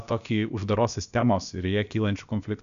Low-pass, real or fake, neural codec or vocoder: 7.2 kHz; fake; codec, 16 kHz, about 1 kbps, DyCAST, with the encoder's durations